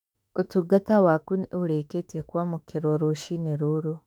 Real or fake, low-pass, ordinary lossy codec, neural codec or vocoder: fake; 19.8 kHz; none; autoencoder, 48 kHz, 32 numbers a frame, DAC-VAE, trained on Japanese speech